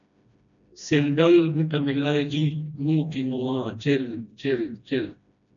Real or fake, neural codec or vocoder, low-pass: fake; codec, 16 kHz, 1 kbps, FreqCodec, smaller model; 7.2 kHz